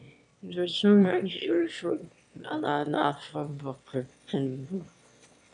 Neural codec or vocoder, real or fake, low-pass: autoencoder, 22.05 kHz, a latent of 192 numbers a frame, VITS, trained on one speaker; fake; 9.9 kHz